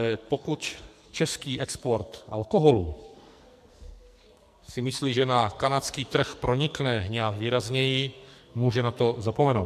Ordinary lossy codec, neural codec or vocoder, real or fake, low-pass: MP3, 96 kbps; codec, 44.1 kHz, 2.6 kbps, SNAC; fake; 14.4 kHz